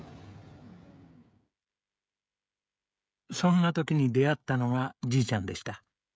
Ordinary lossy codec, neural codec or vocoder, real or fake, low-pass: none; codec, 16 kHz, 16 kbps, FreqCodec, smaller model; fake; none